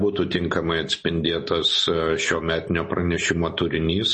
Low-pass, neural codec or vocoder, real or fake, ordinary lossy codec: 10.8 kHz; none; real; MP3, 32 kbps